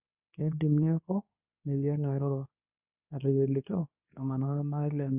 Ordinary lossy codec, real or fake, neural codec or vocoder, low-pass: none; fake; codec, 24 kHz, 0.9 kbps, WavTokenizer, medium speech release version 2; 3.6 kHz